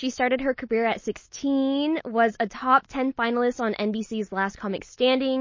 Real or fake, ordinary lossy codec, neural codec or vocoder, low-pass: real; MP3, 32 kbps; none; 7.2 kHz